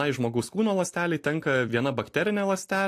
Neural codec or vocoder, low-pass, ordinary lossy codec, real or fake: none; 14.4 kHz; AAC, 64 kbps; real